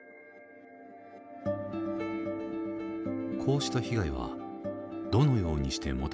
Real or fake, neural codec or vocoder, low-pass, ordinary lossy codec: real; none; none; none